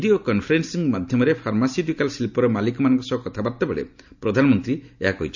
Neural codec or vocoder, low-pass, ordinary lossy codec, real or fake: none; 7.2 kHz; none; real